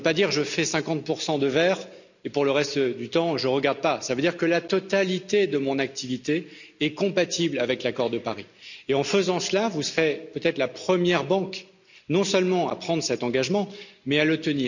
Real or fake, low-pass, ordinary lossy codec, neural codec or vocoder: real; 7.2 kHz; none; none